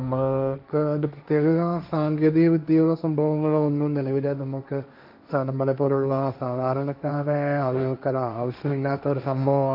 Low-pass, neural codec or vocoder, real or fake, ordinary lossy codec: 5.4 kHz; codec, 16 kHz, 1.1 kbps, Voila-Tokenizer; fake; none